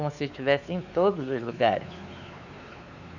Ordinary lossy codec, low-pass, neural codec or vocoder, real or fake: none; 7.2 kHz; codec, 16 kHz, 4 kbps, X-Codec, WavLM features, trained on Multilingual LibriSpeech; fake